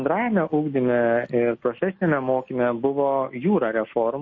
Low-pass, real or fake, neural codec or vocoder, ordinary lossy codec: 7.2 kHz; real; none; MP3, 32 kbps